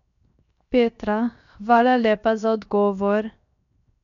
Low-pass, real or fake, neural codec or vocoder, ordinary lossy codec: 7.2 kHz; fake; codec, 16 kHz, 0.7 kbps, FocalCodec; none